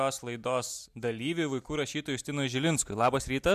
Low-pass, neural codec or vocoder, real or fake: 14.4 kHz; none; real